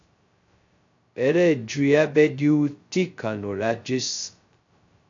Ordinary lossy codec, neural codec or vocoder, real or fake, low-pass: AAC, 64 kbps; codec, 16 kHz, 0.2 kbps, FocalCodec; fake; 7.2 kHz